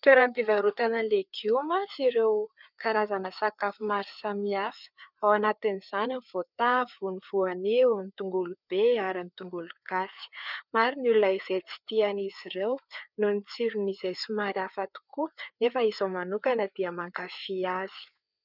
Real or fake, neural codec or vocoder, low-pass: fake; codec, 16 kHz, 4 kbps, FreqCodec, larger model; 5.4 kHz